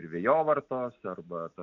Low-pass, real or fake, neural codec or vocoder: 7.2 kHz; real; none